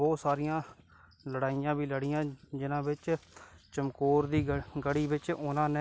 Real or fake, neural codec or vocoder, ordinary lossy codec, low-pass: real; none; none; none